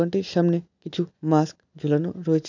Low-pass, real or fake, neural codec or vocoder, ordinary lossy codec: 7.2 kHz; real; none; none